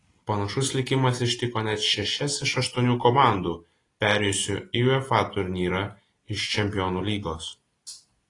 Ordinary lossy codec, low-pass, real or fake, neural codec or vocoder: AAC, 32 kbps; 10.8 kHz; real; none